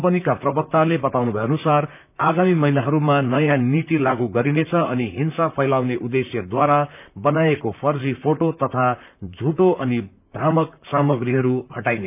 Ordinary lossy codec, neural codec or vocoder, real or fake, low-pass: none; vocoder, 44.1 kHz, 128 mel bands, Pupu-Vocoder; fake; 3.6 kHz